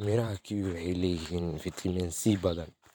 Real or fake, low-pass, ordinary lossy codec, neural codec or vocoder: fake; none; none; vocoder, 44.1 kHz, 128 mel bands every 512 samples, BigVGAN v2